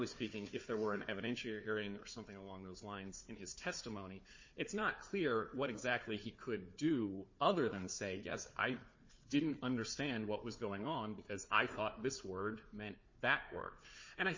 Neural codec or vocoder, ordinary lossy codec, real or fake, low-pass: codec, 16 kHz, 4 kbps, FunCodec, trained on Chinese and English, 50 frames a second; MP3, 32 kbps; fake; 7.2 kHz